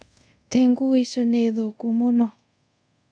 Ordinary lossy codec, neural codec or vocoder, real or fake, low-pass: none; codec, 24 kHz, 0.5 kbps, DualCodec; fake; 9.9 kHz